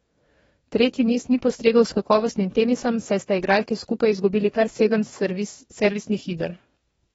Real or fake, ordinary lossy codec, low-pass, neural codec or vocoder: fake; AAC, 24 kbps; 19.8 kHz; codec, 44.1 kHz, 2.6 kbps, DAC